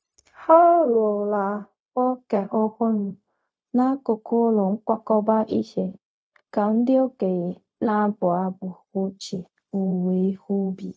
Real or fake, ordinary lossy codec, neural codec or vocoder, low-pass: fake; none; codec, 16 kHz, 0.4 kbps, LongCat-Audio-Codec; none